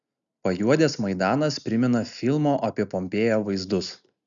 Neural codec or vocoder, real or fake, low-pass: none; real; 7.2 kHz